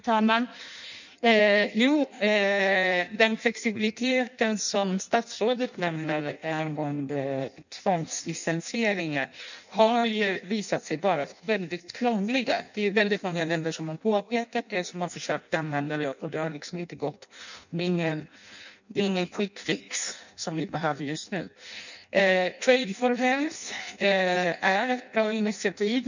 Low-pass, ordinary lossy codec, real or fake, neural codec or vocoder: 7.2 kHz; none; fake; codec, 16 kHz in and 24 kHz out, 0.6 kbps, FireRedTTS-2 codec